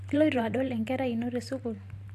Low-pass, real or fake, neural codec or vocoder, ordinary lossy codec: 14.4 kHz; fake; vocoder, 44.1 kHz, 128 mel bands every 512 samples, BigVGAN v2; AAC, 64 kbps